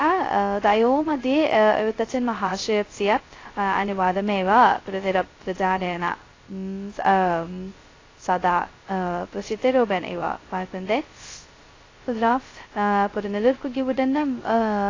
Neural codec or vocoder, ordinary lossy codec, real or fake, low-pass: codec, 16 kHz, 0.2 kbps, FocalCodec; AAC, 32 kbps; fake; 7.2 kHz